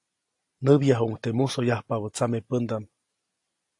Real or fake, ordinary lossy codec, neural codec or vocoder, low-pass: real; AAC, 48 kbps; none; 10.8 kHz